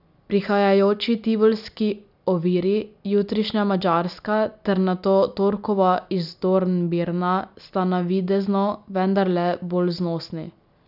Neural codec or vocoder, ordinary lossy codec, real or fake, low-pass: none; none; real; 5.4 kHz